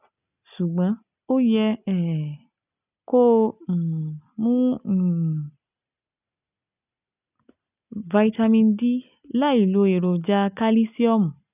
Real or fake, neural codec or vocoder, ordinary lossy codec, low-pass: real; none; none; 3.6 kHz